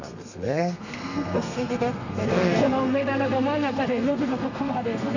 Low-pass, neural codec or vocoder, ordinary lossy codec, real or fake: 7.2 kHz; codec, 32 kHz, 1.9 kbps, SNAC; none; fake